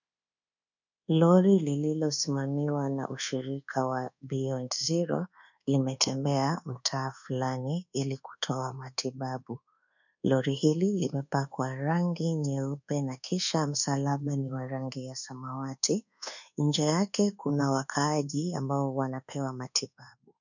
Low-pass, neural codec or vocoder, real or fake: 7.2 kHz; codec, 24 kHz, 1.2 kbps, DualCodec; fake